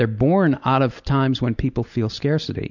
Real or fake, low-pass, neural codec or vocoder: real; 7.2 kHz; none